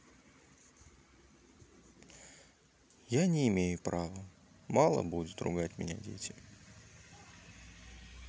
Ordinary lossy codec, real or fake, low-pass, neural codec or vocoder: none; real; none; none